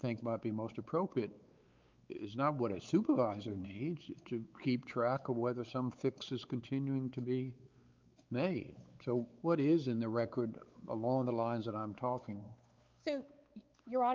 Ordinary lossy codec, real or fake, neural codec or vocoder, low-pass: Opus, 32 kbps; fake; codec, 16 kHz, 4 kbps, X-Codec, WavLM features, trained on Multilingual LibriSpeech; 7.2 kHz